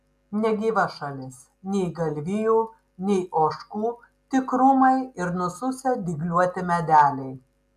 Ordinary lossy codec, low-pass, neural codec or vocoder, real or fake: AAC, 96 kbps; 14.4 kHz; none; real